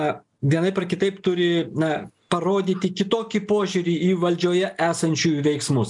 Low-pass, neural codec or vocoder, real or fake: 10.8 kHz; none; real